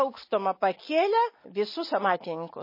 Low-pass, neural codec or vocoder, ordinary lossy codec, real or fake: 5.4 kHz; none; MP3, 24 kbps; real